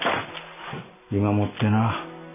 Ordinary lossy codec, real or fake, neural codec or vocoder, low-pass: none; real; none; 3.6 kHz